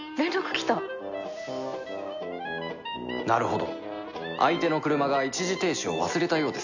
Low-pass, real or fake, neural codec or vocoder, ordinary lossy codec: 7.2 kHz; real; none; none